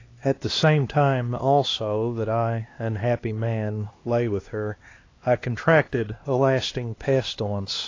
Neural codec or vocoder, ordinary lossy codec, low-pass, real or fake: codec, 16 kHz, 2 kbps, X-Codec, HuBERT features, trained on LibriSpeech; AAC, 32 kbps; 7.2 kHz; fake